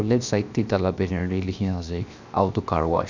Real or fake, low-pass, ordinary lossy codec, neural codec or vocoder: fake; 7.2 kHz; none; codec, 16 kHz, 0.7 kbps, FocalCodec